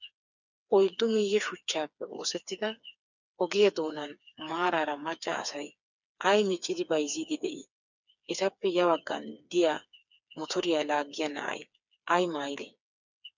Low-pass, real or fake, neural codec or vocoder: 7.2 kHz; fake; codec, 16 kHz, 4 kbps, FreqCodec, smaller model